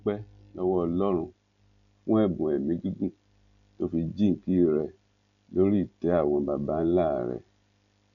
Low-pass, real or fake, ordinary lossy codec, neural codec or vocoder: 7.2 kHz; real; none; none